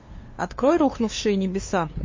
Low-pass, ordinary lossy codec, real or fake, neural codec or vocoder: 7.2 kHz; MP3, 32 kbps; fake; codec, 16 kHz, 2 kbps, FunCodec, trained on LibriTTS, 25 frames a second